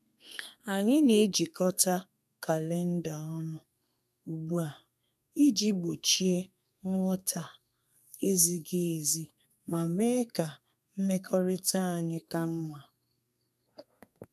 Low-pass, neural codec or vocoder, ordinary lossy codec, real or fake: 14.4 kHz; codec, 32 kHz, 1.9 kbps, SNAC; none; fake